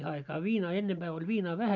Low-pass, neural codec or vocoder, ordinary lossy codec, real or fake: 7.2 kHz; none; none; real